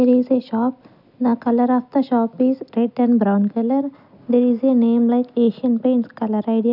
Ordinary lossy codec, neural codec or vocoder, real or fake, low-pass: none; none; real; 5.4 kHz